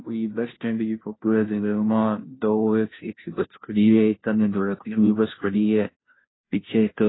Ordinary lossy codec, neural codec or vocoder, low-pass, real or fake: AAC, 16 kbps; codec, 16 kHz, 0.5 kbps, FunCodec, trained on Chinese and English, 25 frames a second; 7.2 kHz; fake